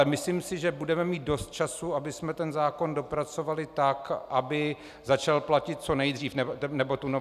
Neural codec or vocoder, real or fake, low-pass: none; real; 14.4 kHz